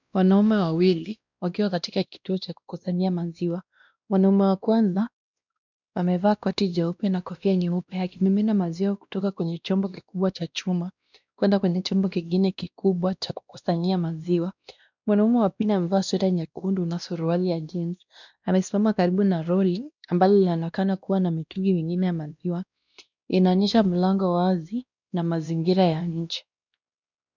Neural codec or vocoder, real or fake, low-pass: codec, 16 kHz, 1 kbps, X-Codec, WavLM features, trained on Multilingual LibriSpeech; fake; 7.2 kHz